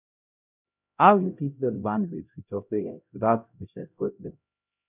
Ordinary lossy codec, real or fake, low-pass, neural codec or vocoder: none; fake; 3.6 kHz; codec, 16 kHz, 0.5 kbps, X-Codec, HuBERT features, trained on LibriSpeech